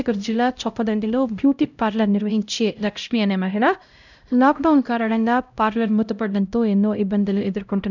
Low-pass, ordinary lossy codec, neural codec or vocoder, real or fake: 7.2 kHz; none; codec, 16 kHz, 0.5 kbps, X-Codec, HuBERT features, trained on LibriSpeech; fake